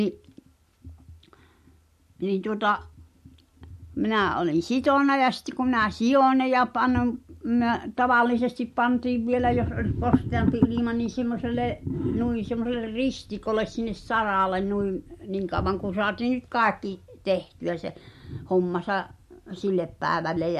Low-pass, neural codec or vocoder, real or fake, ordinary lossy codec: 14.4 kHz; none; real; MP3, 64 kbps